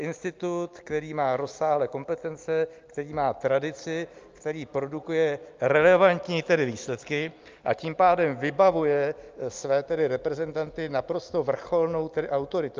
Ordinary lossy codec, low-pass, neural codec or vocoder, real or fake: Opus, 24 kbps; 7.2 kHz; none; real